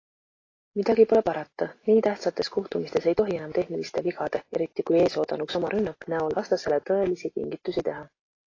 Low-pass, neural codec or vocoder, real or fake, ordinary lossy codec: 7.2 kHz; none; real; AAC, 32 kbps